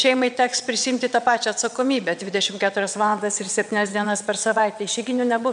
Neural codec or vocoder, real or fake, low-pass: vocoder, 22.05 kHz, 80 mel bands, WaveNeXt; fake; 9.9 kHz